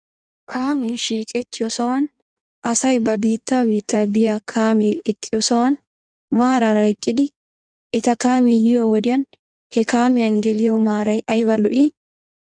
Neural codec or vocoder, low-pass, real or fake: codec, 16 kHz in and 24 kHz out, 1.1 kbps, FireRedTTS-2 codec; 9.9 kHz; fake